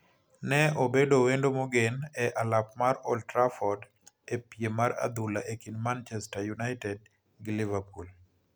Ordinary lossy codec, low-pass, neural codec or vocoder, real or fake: none; none; none; real